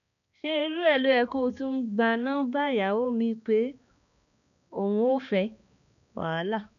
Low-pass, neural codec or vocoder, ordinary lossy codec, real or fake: 7.2 kHz; codec, 16 kHz, 2 kbps, X-Codec, HuBERT features, trained on balanced general audio; AAC, 96 kbps; fake